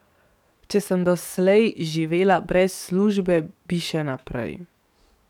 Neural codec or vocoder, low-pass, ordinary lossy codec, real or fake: codec, 44.1 kHz, 7.8 kbps, DAC; 19.8 kHz; none; fake